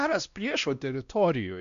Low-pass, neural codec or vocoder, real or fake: 7.2 kHz; codec, 16 kHz, 1 kbps, X-Codec, WavLM features, trained on Multilingual LibriSpeech; fake